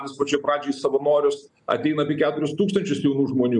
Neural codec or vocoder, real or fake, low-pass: none; real; 10.8 kHz